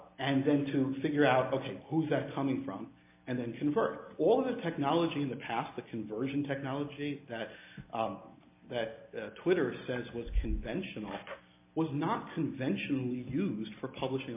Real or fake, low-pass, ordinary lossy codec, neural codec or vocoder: real; 3.6 kHz; MP3, 24 kbps; none